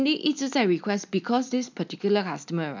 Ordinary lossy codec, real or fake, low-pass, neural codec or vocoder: MP3, 64 kbps; real; 7.2 kHz; none